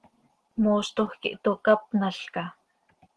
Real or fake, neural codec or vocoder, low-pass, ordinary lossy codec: real; none; 10.8 kHz; Opus, 16 kbps